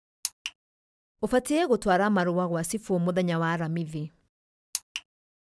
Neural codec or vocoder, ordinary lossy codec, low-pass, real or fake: none; none; none; real